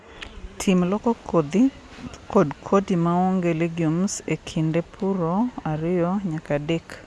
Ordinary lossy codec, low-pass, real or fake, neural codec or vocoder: none; none; real; none